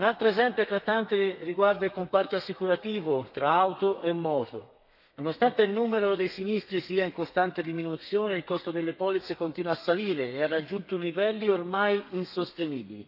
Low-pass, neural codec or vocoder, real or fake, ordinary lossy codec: 5.4 kHz; codec, 32 kHz, 1.9 kbps, SNAC; fake; none